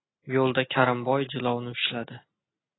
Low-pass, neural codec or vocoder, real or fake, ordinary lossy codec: 7.2 kHz; none; real; AAC, 16 kbps